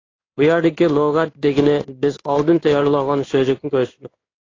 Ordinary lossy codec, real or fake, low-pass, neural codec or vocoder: AAC, 32 kbps; fake; 7.2 kHz; codec, 16 kHz in and 24 kHz out, 1 kbps, XY-Tokenizer